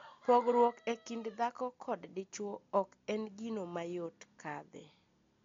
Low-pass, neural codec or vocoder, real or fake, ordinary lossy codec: 7.2 kHz; none; real; MP3, 48 kbps